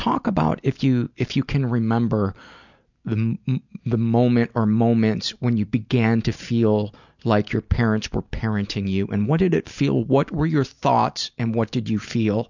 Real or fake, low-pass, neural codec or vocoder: real; 7.2 kHz; none